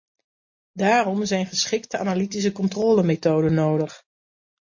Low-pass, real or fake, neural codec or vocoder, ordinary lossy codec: 7.2 kHz; real; none; MP3, 32 kbps